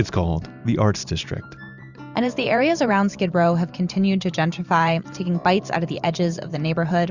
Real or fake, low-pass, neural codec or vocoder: fake; 7.2 kHz; vocoder, 44.1 kHz, 128 mel bands every 512 samples, BigVGAN v2